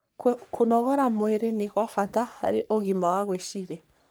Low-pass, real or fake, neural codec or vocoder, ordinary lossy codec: none; fake; codec, 44.1 kHz, 3.4 kbps, Pupu-Codec; none